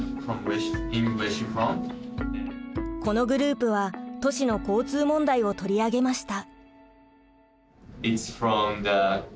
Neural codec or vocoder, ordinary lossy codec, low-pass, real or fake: none; none; none; real